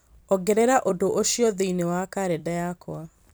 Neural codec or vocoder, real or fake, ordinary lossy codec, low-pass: vocoder, 44.1 kHz, 128 mel bands every 256 samples, BigVGAN v2; fake; none; none